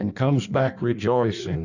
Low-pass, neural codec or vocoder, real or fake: 7.2 kHz; codec, 16 kHz in and 24 kHz out, 1.1 kbps, FireRedTTS-2 codec; fake